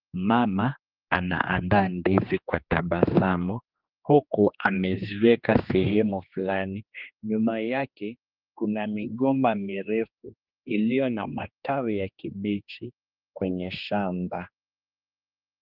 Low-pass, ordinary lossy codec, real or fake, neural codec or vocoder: 5.4 kHz; Opus, 24 kbps; fake; codec, 16 kHz, 2 kbps, X-Codec, HuBERT features, trained on general audio